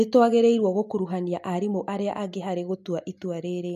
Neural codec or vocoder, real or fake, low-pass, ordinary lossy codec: none; real; 14.4 kHz; MP3, 64 kbps